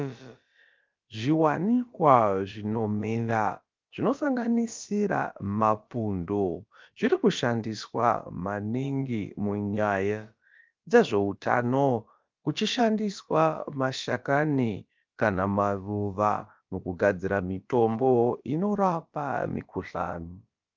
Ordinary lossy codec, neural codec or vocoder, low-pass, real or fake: Opus, 24 kbps; codec, 16 kHz, about 1 kbps, DyCAST, with the encoder's durations; 7.2 kHz; fake